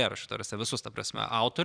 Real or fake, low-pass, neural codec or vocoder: fake; 9.9 kHz; vocoder, 44.1 kHz, 128 mel bands every 256 samples, BigVGAN v2